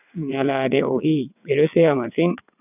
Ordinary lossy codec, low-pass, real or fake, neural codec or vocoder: none; 3.6 kHz; fake; vocoder, 22.05 kHz, 80 mel bands, WaveNeXt